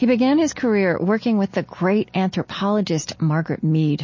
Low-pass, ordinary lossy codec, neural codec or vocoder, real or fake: 7.2 kHz; MP3, 32 kbps; none; real